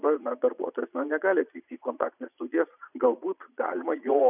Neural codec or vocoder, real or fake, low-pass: vocoder, 22.05 kHz, 80 mel bands, Vocos; fake; 3.6 kHz